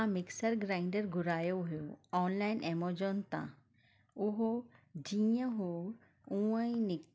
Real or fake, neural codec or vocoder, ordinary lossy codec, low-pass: real; none; none; none